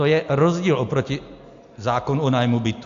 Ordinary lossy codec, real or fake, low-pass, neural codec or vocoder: AAC, 64 kbps; real; 7.2 kHz; none